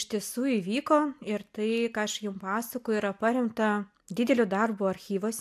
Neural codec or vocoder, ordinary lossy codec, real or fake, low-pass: none; MP3, 96 kbps; real; 14.4 kHz